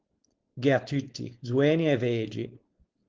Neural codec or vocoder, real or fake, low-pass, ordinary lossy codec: codec, 16 kHz, 4.8 kbps, FACodec; fake; 7.2 kHz; Opus, 16 kbps